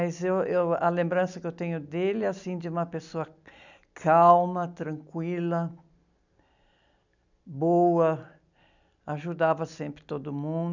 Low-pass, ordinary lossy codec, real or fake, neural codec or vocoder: 7.2 kHz; none; real; none